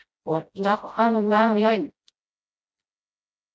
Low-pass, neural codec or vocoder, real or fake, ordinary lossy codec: none; codec, 16 kHz, 0.5 kbps, FreqCodec, smaller model; fake; none